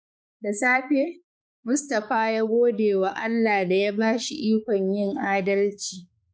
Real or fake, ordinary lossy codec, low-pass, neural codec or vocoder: fake; none; none; codec, 16 kHz, 4 kbps, X-Codec, HuBERT features, trained on balanced general audio